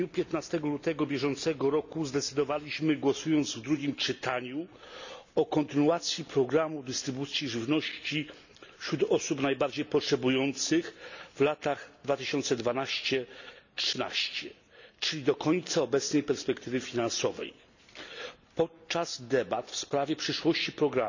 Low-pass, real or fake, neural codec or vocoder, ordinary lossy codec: 7.2 kHz; real; none; none